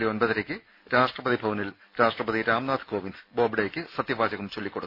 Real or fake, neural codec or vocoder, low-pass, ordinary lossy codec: real; none; 5.4 kHz; none